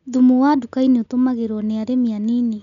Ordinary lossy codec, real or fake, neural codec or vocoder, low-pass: none; real; none; 7.2 kHz